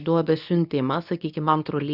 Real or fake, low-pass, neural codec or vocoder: fake; 5.4 kHz; codec, 24 kHz, 0.9 kbps, WavTokenizer, medium speech release version 2